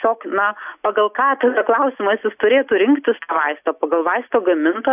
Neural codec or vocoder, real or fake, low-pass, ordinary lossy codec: none; real; 3.6 kHz; AAC, 32 kbps